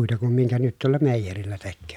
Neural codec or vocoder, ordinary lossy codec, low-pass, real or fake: none; none; 19.8 kHz; real